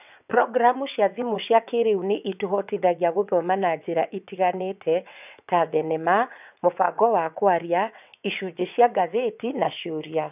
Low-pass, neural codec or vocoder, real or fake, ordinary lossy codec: 3.6 kHz; vocoder, 22.05 kHz, 80 mel bands, WaveNeXt; fake; none